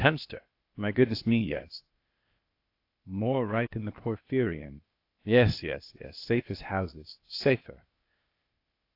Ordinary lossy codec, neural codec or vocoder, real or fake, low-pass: AAC, 32 kbps; codec, 16 kHz, 0.8 kbps, ZipCodec; fake; 5.4 kHz